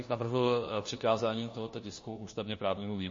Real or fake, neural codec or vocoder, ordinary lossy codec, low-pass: fake; codec, 16 kHz, 1 kbps, FunCodec, trained on LibriTTS, 50 frames a second; MP3, 32 kbps; 7.2 kHz